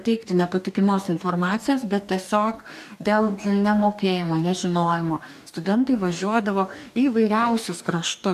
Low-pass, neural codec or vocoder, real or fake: 14.4 kHz; codec, 44.1 kHz, 2.6 kbps, DAC; fake